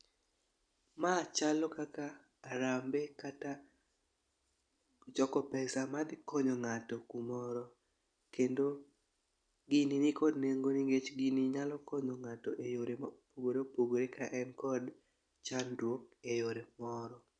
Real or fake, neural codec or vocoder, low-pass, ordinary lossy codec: real; none; 9.9 kHz; none